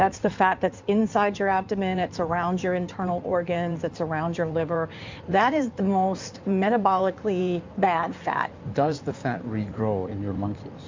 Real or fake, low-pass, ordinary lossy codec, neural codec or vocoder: fake; 7.2 kHz; MP3, 64 kbps; codec, 16 kHz in and 24 kHz out, 2.2 kbps, FireRedTTS-2 codec